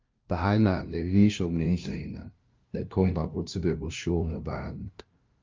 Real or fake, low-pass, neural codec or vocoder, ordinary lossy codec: fake; 7.2 kHz; codec, 16 kHz, 0.5 kbps, FunCodec, trained on LibriTTS, 25 frames a second; Opus, 24 kbps